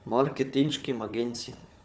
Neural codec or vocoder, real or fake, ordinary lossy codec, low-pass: codec, 16 kHz, 4 kbps, FunCodec, trained on Chinese and English, 50 frames a second; fake; none; none